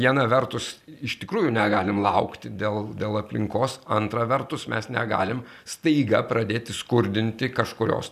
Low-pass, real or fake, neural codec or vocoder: 14.4 kHz; real; none